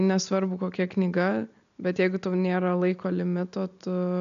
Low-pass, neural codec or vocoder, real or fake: 7.2 kHz; none; real